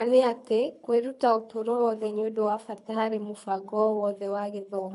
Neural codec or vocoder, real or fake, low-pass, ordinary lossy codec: codec, 24 kHz, 3 kbps, HILCodec; fake; 10.8 kHz; none